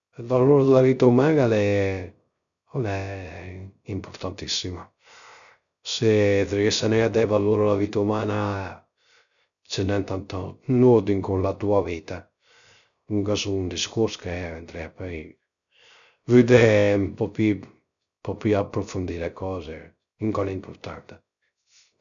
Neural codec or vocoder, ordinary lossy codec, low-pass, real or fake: codec, 16 kHz, 0.3 kbps, FocalCodec; none; 7.2 kHz; fake